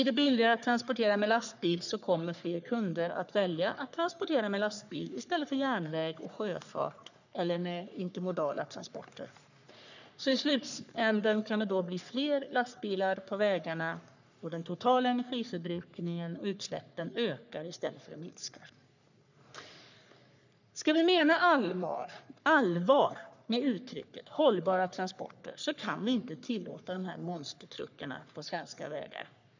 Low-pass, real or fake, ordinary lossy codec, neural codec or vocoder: 7.2 kHz; fake; none; codec, 44.1 kHz, 3.4 kbps, Pupu-Codec